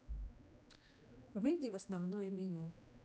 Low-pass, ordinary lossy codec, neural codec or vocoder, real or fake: none; none; codec, 16 kHz, 1 kbps, X-Codec, HuBERT features, trained on general audio; fake